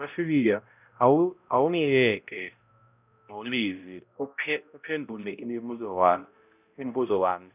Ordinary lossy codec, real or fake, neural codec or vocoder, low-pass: none; fake; codec, 16 kHz, 0.5 kbps, X-Codec, HuBERT features, trained on balanced general audio; 3.6 kHz